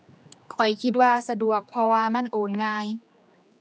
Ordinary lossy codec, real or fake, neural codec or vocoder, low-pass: none; fake; codec, 16 kHz, 2 kbps, X-Codec, HuBERT features, trained on general audio; none